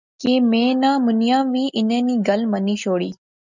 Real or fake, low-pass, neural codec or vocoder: real; 7.2 kHz; none